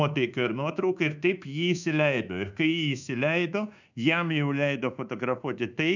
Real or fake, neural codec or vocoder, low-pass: fake; codec, 24 kHz, 1.2 kbps, DualCodec; 7.2 kHz